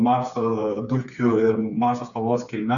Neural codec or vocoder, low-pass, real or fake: codec, 16 kHz, 4 kbps, FreqCodec, smaller model; 7.2 kHz; fake